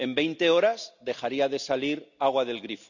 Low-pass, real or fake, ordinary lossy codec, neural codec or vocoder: 7.2 kHz; real; none; none